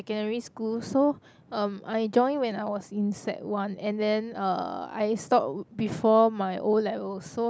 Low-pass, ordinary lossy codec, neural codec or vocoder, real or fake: none; none; none; real